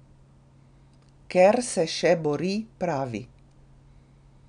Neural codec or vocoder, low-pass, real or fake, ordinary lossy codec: none; 9.9 kHz; real; none